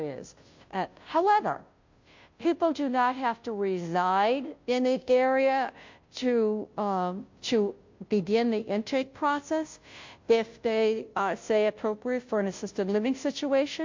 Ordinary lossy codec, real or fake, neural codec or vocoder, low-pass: MP3, 48 kbps; fake; codec, 16 kHz, 0.5 kbps, FunCodec, trained on Chinese and English, 25 frames a second; 7.2 kHz